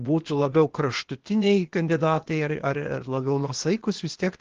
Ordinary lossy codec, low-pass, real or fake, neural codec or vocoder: Opus, 16 kbps; 7.2 kHz; fake; codec, 16 kHz, 0.8 kbps, ZipCodec